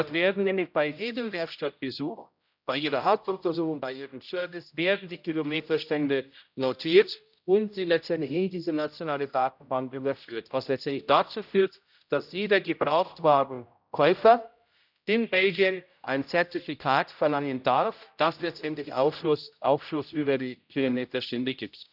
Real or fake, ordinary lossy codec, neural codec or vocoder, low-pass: fake; none; codec, 16 kHz, 0.5 kbps, X-Codec, HuBERT features, trained on general audio; 5.4 kHz